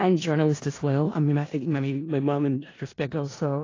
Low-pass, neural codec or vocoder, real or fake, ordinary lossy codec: 7.2 kHz; codec, 16 kHz in and 24 kHz out, 0.4 kbps, LongCat-Audio-Codec, four codebook decoder; fake; AAC, 32 kbps